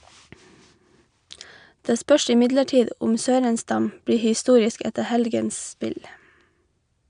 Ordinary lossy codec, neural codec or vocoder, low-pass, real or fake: none; none; 9.9 kHz; real